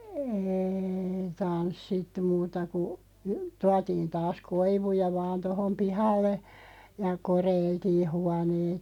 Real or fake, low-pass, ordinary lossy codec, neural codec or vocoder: real; 19.8 kHz; none; none